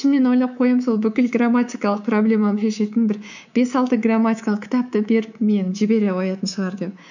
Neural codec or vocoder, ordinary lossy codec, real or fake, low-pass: codec, 24 kHz, 3.1 kbps, DualCodec; none; fake; 7.2 kHz